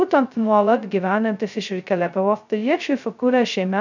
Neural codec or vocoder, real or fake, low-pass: codec, 16 kHz, 0.2 kbps, FocalCodec; fake; 7.2 kHz